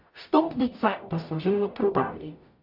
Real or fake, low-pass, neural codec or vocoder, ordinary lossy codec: fake; 5.4 kHz; codec, 44.1 kHz, 0.9 kbps, DAC; MP3, 48 kbps